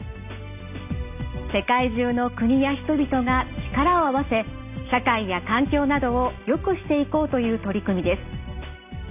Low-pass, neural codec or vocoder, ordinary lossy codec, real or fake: 3.6 kHz; none; none; real